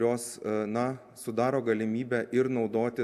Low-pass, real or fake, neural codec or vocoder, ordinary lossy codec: 14.4 kHz; real; none; AAC, 96 kbps